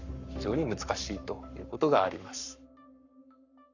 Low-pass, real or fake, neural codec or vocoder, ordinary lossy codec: 7.2 kHz; fake; codec, 44.1 kHz, 7.8 kbps, Pupu-Codec; none